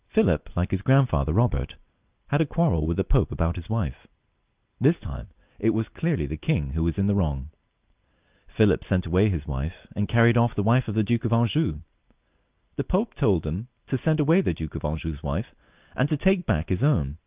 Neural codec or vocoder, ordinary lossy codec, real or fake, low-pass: none; Opus, 24 kbps; real; 3.6 kHz